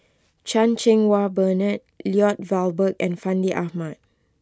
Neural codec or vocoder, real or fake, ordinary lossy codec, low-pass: none; real; none; none